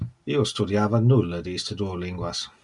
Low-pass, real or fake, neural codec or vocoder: 10.8 kHz; real; none